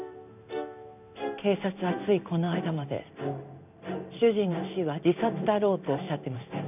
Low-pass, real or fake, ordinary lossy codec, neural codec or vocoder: 3.6 kHz; fake; none; codec, 16 kHz in and 24 kHz out, 1 kbps, XY-Tokenizer